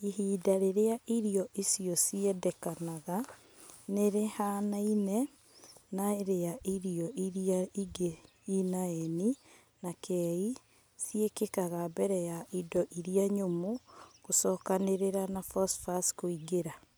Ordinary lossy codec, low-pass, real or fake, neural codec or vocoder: none; none; real; none